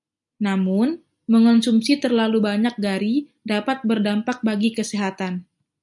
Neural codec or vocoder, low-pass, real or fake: none; 10.8 kHz; real